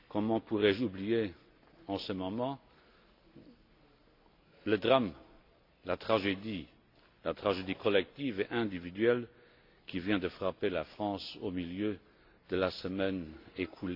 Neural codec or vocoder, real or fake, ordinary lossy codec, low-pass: none; real; AAC, 32 kbps; 5.4 kHz